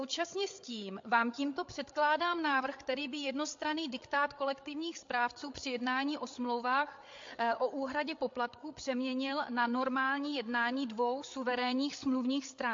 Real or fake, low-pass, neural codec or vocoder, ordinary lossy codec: fake; 7.2 kHz; codec, 16 kHz, 8 kbps, FreqCodec, larger model; MP3, 48 kbps